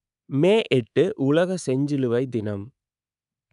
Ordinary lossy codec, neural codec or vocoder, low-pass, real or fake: none; codec, 24 kHz, 3.1 kbps, DualCodec; 10.8 kHz; fake